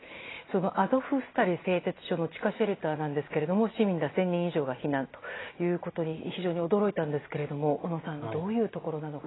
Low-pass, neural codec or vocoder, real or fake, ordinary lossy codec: 7.2 kHz; none; real; AAC, 16 kbps